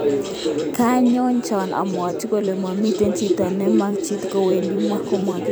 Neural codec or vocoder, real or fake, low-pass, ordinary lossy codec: vocoder, 44.1 kHz, 128 mel bands every 256 samples, BigVGAN v2; fake; none; none